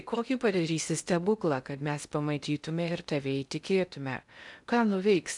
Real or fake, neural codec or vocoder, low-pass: fake; codec, 16 kHz in and 24 kHz out, 0.6 kbps, FocalCodec, streaming, 2048 codes; 10.8 kHz